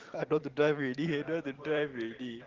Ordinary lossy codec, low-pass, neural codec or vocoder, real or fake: Opus, 16 kbps; 7.2 kHz; vocoder, 44.1 kHz, 128 mel bands every 512 samples, BigVGAN v2; fake